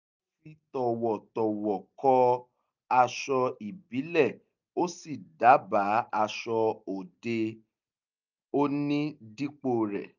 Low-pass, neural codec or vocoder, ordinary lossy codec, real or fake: 7.2 kHz; none; none; real